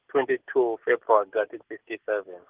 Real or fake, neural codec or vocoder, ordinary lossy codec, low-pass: real; none; Opus, 16 kbps; 3.6 kHz